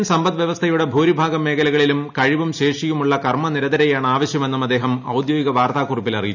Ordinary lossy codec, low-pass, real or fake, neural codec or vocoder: none; 7.2 kHz; real; none